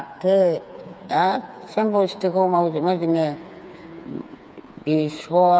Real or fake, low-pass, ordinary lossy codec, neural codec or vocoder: fake; none; none; codec, 16 kHz, 4 kbps, FreqCodec, smaller model